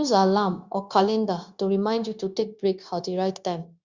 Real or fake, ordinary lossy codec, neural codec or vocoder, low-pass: fake; Opus, 64 kbps; codec, 16 kHz, 0.9 kbps, LongCat-Audio-Codec; 7.2 kHz